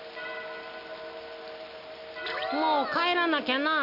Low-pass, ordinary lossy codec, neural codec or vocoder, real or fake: 5.4 kHz; none; none; real